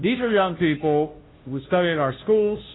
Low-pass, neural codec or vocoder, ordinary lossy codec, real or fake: 7.2 kHz; codec, 16 kHz, 0.5 kbps, FunCodec, trained on Chinese and English, 25 frames a second; AAC, 16 kbps; fake